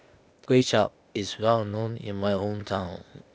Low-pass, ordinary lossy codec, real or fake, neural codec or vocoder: none; none; fake; codec, 16 kHz, 0.8 kbps, ZipCodec